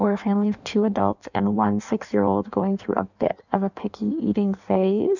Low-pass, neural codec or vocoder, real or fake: 7.2 kHz; codec, 16 kHz in and 24 kHz out, 1.1 kbps, FireRedTTS-2 codec; fake